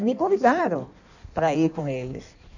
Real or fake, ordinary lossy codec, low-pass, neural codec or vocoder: fake; none; 7.2 kHz; codec, 16 kHz in and 24 kHz out, 1.1 kbps, FireRedTTS-2 codec